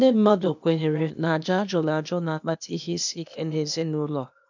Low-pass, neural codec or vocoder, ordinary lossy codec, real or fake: 7.2 kHz; codec, 16 kHz, 0.8 kbps, ZipCodec; none; fake